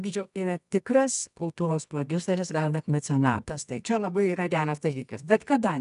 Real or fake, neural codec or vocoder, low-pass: fake; codec, 24 kHz, 0.9 kbps, WavTokenizer, medium music audio release; 10.8 kHz